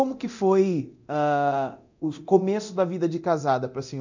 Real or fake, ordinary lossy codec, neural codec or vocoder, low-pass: fake; none; codec, 24 kHz, 0.9 kbps, DualCodec; 7.2 kHz